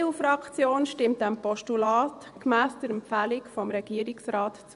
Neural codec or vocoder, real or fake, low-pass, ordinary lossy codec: vocoder, 24 kHz, 100 mel bands, Vocos; fake; 10.8 kHz; none